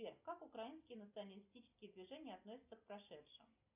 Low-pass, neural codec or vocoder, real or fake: 3.6 kHz; none; real